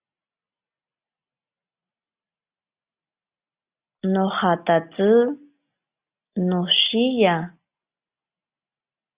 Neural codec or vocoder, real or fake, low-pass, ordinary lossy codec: none; real; 3.6 kHz; Opus, 64 kbps